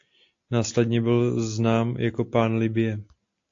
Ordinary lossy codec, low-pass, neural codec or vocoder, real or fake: AAC, 48 kbps; 7.2 kHz; none; real